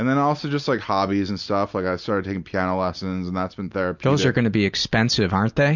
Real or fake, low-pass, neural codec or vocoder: real; 7.2 kHz; none